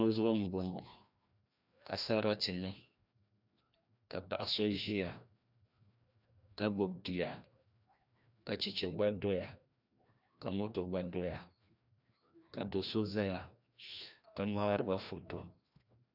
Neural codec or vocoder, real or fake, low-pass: codec, 16 kHz, 1 kbps, FreqCodec, larger model; fake; 5.4 kHz